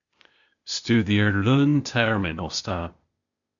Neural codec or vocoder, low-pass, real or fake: codec, 16 kHz, 0.8 kbps, ZipCodec; 7.2 kHz; fake